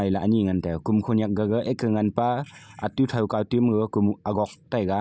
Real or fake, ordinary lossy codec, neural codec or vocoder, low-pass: real; none; none; none